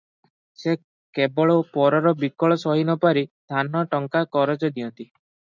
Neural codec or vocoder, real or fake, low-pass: none; real; 7.2 kHz